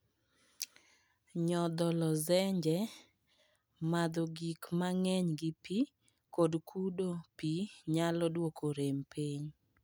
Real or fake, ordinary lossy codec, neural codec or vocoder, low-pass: real; none; none; none